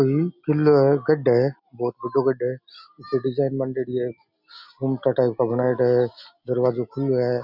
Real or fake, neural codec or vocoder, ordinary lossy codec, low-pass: real; none; none; 5.4 kHz